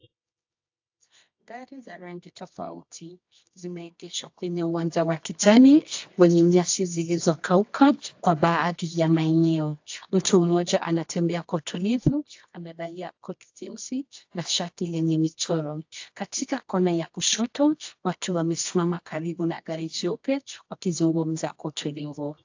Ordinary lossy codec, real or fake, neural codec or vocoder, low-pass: AAC, 48 kbps; fake; codec, 24 kHz, 0.9 kbps, WavTokenizer, medium music audio release; 7.2 kHz